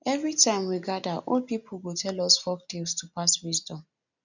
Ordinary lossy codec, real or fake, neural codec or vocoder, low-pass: none; real; none; 7.2 kHz